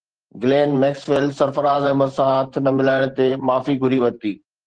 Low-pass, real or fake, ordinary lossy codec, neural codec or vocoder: 9.9 kHz; fake; Opus, 16 kbps; vocoder, 44.1 kHz, 128 mel bands every 512 samples, BigVGAN v2